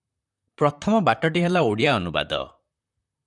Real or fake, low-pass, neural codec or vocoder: fake; 10.8 kHz; vocoder, 44.1 kHz, 128 mel bands, Pupu-Vocoder